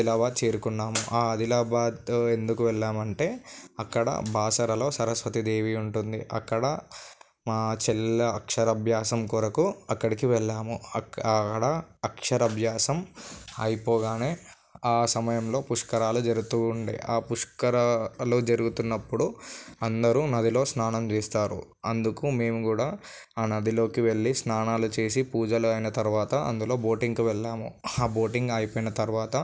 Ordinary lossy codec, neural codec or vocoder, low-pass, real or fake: none; none; none; real